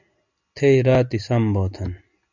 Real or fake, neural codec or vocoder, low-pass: real; none; 7.2 kHz